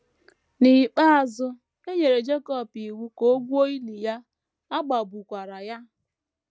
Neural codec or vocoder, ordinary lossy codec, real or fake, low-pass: none; none; real; none